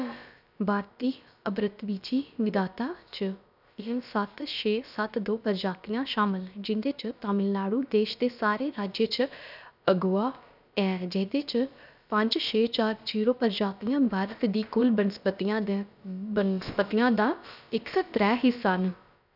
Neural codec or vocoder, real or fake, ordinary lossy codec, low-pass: codec, 16 kHz, about 1 kbps, DyCAST, with the encoder's durations; fake; none; 5.4 kHz